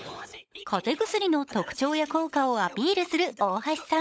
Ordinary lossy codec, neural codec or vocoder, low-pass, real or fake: none; codec, 16 kHz, 16 kbps, FunCodec, trained on LibriTTS, 50 frames a second; none; fake